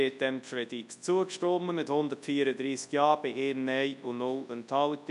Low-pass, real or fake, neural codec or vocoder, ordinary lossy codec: 10.8 kHz; fake; codec, 24 kHz, 0.9 kbps, WavTokenizer, large speech release; none